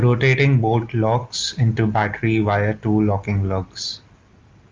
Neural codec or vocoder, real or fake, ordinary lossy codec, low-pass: none; real; Opus, 24 kbps; 7.2 kHz